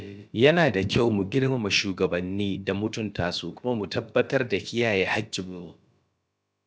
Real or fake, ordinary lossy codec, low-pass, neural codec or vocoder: fake; none; none; codec, 16 kHz, about 1 kbps, DyCAST, with the encoder's durations